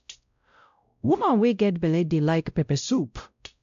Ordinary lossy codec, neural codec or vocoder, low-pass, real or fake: AAC, 64 kbps; codec, 16 kHz, 0.5 kbps, X-Codec, WavLM features, trained on Multilingual LibriSpeech; 7.2 kHz; fake